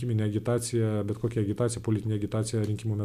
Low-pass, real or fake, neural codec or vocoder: 14.4 kHz; real; none